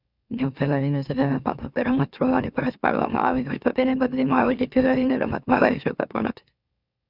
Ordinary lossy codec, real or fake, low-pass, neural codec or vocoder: none; fake; 5.4 kHz; autoencoder, 44.1 kHz, a latent of 192 numbers a frame, MeloTTS